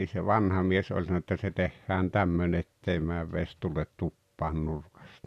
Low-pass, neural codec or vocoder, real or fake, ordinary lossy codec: 14.4 kHz; none; real; none